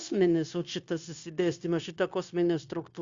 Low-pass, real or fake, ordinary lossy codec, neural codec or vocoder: 7.2 kHz; fake; Opus, 64 kbps; codec, 16 kHz, 0.9 kbps, LongCat-Audio-Codec